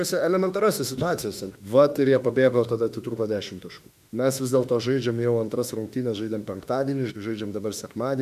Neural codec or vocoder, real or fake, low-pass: autoencoder, 48 kHz, 32 numbers a frame, DAC-VAE, trained on Japanese speech; fake; 14.4 kHz